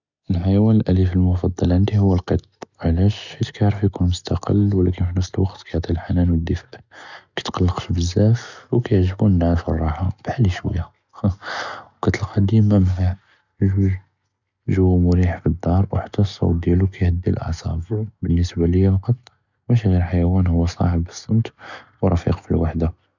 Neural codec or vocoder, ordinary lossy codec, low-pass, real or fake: none; none; 7.2 kHz; real